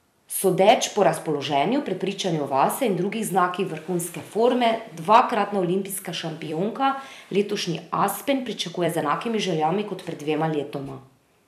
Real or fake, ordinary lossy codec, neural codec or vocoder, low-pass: fake; none; vocoder, 44.1 kHz, 128 mel bands every 256 samples, BigVGAN v2; 14.4 kHz